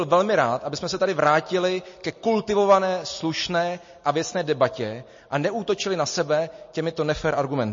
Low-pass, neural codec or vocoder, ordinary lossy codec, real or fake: 7.2 kHz; none; MP3, 32 kbps; real